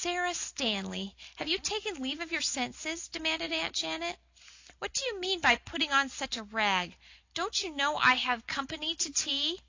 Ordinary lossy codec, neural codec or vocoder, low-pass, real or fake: AAC, 48 kbps; none; 7.2 kHz; real